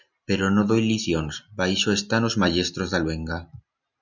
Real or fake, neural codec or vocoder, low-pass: real; none; 7.2 kHz